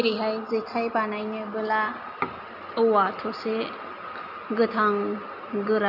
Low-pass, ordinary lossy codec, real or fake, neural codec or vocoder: 5.4 kHz; none; real; none